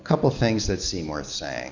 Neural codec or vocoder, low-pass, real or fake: none; 7.2 kHz; real